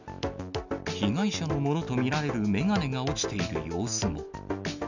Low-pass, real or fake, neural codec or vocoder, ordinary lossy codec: 7.2 kHz; real; none; none